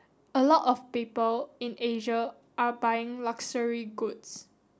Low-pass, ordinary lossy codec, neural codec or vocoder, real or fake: none; none; none; real